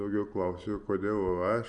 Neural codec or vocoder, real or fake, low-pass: none; real; 9.9 kHz